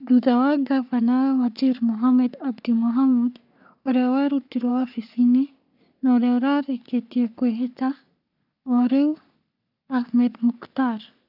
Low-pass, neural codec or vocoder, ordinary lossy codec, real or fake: 5.4 kHz; codec, 44.1 kHz, 3.4 kbps, Pupu-Codec; none; fake